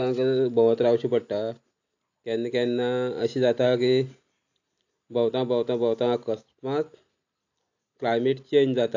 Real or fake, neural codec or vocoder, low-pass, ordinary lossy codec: real; none; 7.2 kHz; AAC, 48 kbps